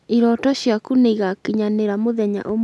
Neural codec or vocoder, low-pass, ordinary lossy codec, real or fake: none; none; none; real